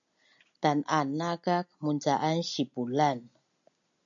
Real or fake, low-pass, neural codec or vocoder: real; 7.2 kHz; none